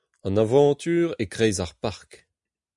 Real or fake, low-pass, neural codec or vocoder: real; 10.8 kHz; none